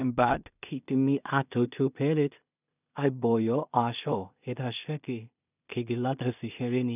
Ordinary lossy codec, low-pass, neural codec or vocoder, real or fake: none; 3.6 kHz; codec, 16 kHz in and 24 kHz out, 0.4 kbps, LongCat-Audio-Codec, two codebook decoder; fake